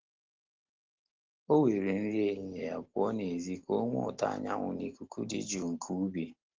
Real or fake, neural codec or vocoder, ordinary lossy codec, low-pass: real; none; Opus, 16 kbps; 7.2 kHz